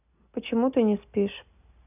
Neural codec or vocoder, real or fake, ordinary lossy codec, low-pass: none; real; none; 3.6 kHz